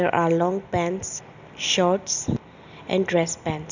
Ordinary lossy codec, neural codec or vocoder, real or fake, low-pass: none; none; real; 7.2 kHz